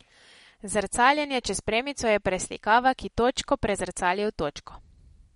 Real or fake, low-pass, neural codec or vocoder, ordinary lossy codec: real; 10.8 kHz; none; MP3, 48 kbps